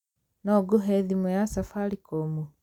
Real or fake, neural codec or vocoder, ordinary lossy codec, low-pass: real; none; none; 19.8 kHz